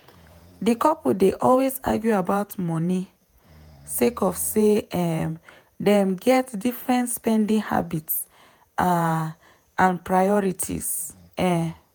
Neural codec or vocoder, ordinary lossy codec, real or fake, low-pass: vocoder, 48 kHz, 128 mel bands, Vocos; none; fake; none